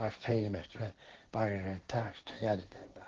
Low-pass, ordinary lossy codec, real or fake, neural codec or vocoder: 7.2 kHz; Opus, 16 kbps; fake; codec, 16 kHz, 1.1 kbps, Voila-Tokenizer